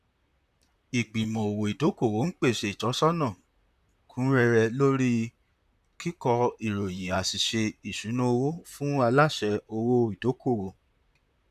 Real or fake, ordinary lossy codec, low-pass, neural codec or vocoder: fake; none; 14.4 kHz; vocoder, 44.1 kHz, 128 mel bands, Pupu-Vocoder